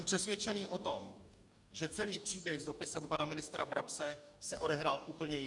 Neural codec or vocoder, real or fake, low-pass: codec, 44.1 kHz, 2.6 kbps, DAC; fake; 10.8 kHz